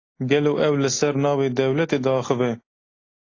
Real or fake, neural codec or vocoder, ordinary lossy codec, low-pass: real; none; AAC, 32 kbps; 7.2 kHz